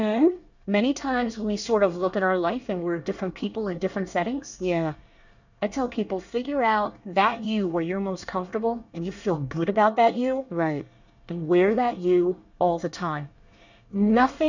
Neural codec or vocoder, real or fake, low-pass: codec, 24 kHz, 1 kbps, SNAC; fake; 7.2 kHz